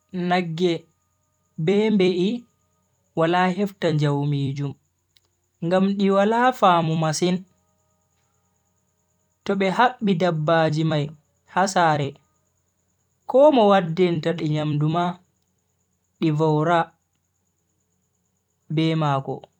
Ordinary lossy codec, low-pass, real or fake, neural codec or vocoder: none; 19.8 kHz; fake; vocoder, 44.1 kHz, 128 mel bands every 256 samples, BigVGAN v2